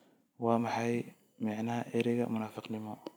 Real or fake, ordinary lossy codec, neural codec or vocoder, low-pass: fake; none; vocoder, 44.1 kHz, 128 mel bands every 256 samples, BigVGAN v2; none